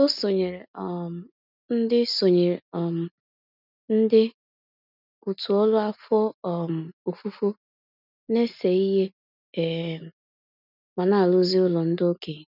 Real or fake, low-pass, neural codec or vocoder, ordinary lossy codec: real; 5.4 kHz; none; none